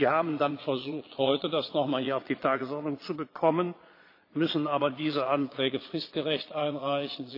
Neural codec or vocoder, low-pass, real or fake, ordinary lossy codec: vocoder, 22.05 kHz, 80 mel bands, Vocos; 5.4 kHz; fake; AAC, 32 kbps